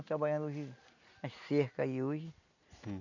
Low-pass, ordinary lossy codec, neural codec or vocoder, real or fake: 7.2 kHz; none; none; real